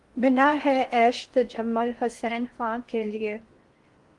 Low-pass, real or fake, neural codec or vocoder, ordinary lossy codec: 10.8 kHz; fake; codec, 16 kHz in and 24 kHz out, 0.6 kbps, FocalCodec, streaming, 4096 codes; Opus, 24 kbps